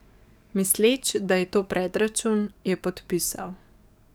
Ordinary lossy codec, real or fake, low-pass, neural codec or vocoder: none; fake; none; codec, 44.1 kHz, 7.8 kbps, DAC